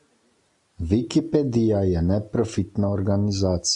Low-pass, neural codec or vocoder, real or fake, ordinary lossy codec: 10.8 kHz; none; real; AAC, 64 kbps